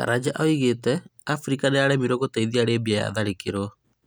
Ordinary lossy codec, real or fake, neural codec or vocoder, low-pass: none; real; none; none